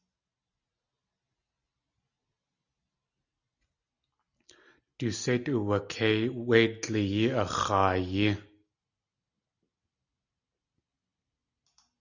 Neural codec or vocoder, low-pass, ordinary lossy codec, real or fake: none; 7.2 kHz; Opus, 64 kbps; real